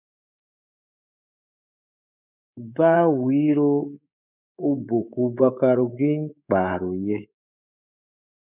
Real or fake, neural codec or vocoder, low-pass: fake; codec, 24 kHz, 3.1 kbps, DualCodec; 3.6 kHz